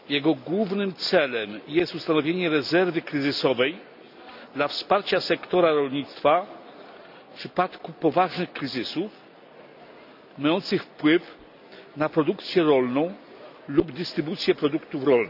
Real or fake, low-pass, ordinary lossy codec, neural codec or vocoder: real; 5.4 kHz; none; none